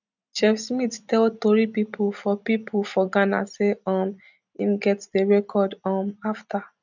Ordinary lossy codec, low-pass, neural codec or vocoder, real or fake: none; 7.2 kHz; none; real